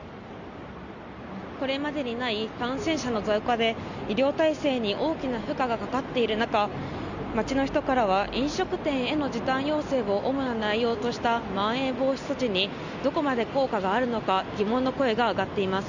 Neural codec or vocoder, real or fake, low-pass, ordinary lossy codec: none; real; 7.2 kHz; Opus, 64 kbps